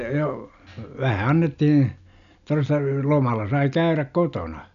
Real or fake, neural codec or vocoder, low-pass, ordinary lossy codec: real; none; 7.2 kHz; none